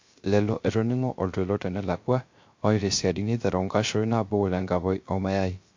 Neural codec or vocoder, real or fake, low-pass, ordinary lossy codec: codec, 16 kHz, 0.3 kbps, FocalCodec; fake; 7.2 kHz; MP3, 48 kbps